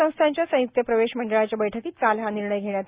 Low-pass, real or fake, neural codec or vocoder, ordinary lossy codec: 3.6 kHz; real; none; Opus, 64 kbps